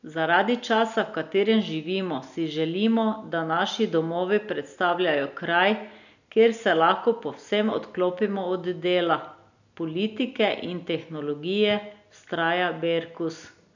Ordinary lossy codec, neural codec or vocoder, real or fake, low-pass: none; none; real; 7.2 kHz